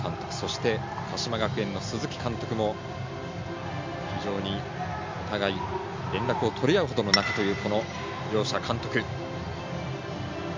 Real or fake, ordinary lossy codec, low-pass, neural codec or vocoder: real; MP3, 64 kbps; 7.2 kHz; none